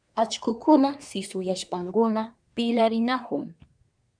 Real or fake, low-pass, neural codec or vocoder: fake; 9.9 kHz; codec, 24 kHz, 1 kbps, SNAC